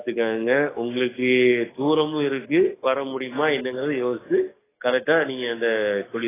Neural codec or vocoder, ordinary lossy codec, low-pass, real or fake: codec, 44.1 kHz, 7.8 kbps, DAC; AAC, 16 kbps; 3.6 kHz; fake